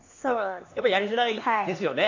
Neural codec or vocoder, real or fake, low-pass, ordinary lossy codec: codec, 16 kHz, 2 kbps, X-Codec, HuBERT features, trained on LibriSpeech; fake; 7.2 kHz; AAC, 48 kbps